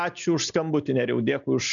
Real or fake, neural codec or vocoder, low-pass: real; none; 7.2 kHz